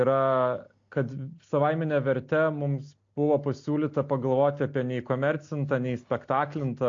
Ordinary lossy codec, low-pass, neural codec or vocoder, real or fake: AAC, 48 kbps; 7.2 kHz; none; real